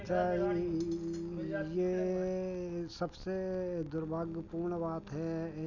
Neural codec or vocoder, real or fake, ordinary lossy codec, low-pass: none; real; none; 7.2 kHz